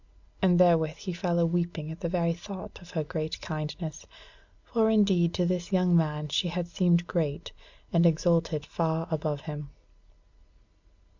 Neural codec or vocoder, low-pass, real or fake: none; 7.2 kHz; real